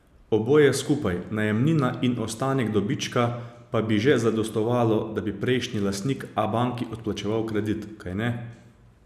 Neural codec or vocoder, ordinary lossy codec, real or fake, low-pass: none; none; real; 14.4 kHz